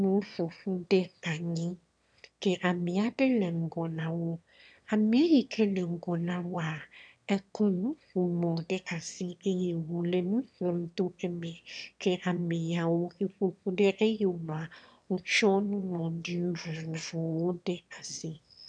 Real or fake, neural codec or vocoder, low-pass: fake; autoencoder, 22.05 kHz, a latent of 192 numbers a frame, VITS, trained on one speaker; 9.9 kHz